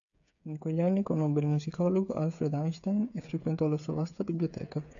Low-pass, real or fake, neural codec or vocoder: 7.2 kHz; fake; codec, 16 kHz, 8 kbps, FreqCodec, smaller model